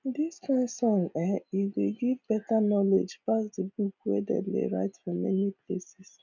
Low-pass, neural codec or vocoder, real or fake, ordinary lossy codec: 7.2 kHz; none; real; none